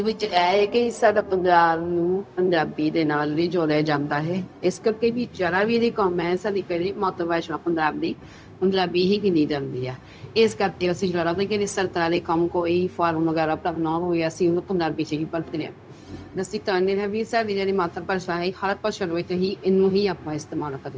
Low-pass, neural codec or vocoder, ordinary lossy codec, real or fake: none; codec, 16 kHz, 0.4 kbps, LongCat-Audio-Codec; none; fake